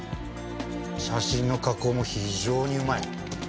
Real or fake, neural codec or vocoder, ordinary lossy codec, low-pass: real; none; none; none